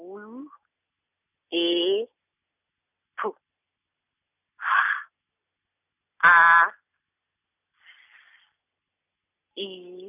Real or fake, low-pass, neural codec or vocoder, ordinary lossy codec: real; 3.6 kHz; none; none